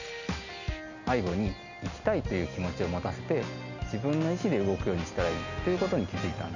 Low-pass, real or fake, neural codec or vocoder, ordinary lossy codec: 7.2 kHz; real; none; none